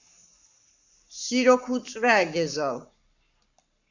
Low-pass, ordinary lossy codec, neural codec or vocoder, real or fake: 7.2 kHz; Opus, 64 kbps; codec, 44.1 kHz, 7.8 kbps, Pupu-Codec; fake